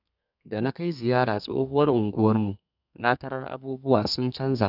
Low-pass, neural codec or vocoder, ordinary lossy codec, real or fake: 5.4 kHz; codec, 32 kHz, 1.9 kbps, SNAC; none; fake